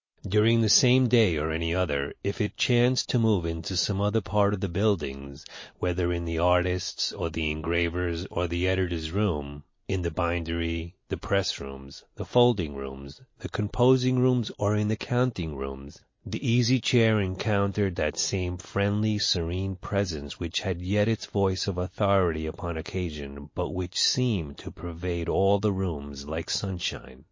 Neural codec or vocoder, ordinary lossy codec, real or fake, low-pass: none; MP3, 32 kbps; real; 7.2 kHz